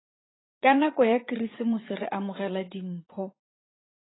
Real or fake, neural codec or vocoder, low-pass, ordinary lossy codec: fake; vocoder, 44.1 kHz, 80 mel bands, Vocos; 7.2 kHz; AAC, 16 kbps